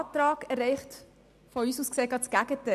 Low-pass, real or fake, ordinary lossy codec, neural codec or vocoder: 14.4 kHz; real; none; none